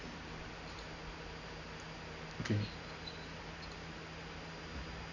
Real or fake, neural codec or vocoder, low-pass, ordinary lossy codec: real; none; 7.2 kHz; none